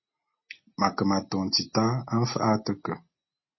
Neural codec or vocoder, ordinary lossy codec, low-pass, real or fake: none; MP3, 24 kbps; 7.2 kHz; real